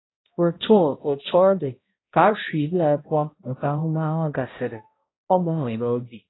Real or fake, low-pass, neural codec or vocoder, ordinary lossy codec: fake; 7.2 kHz; codec, 16 kHz, 0.5 kbps, X-Codec, HuBERT features, trained on balanced general audio; AAC, 16 kbps